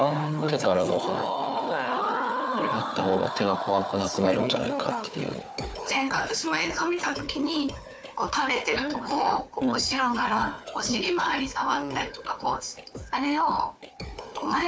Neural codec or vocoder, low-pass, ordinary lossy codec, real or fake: codec, 16 kHz, 4 kbps, FunCodec, trained on Chinese and English, 50 frames a second; none; none; fake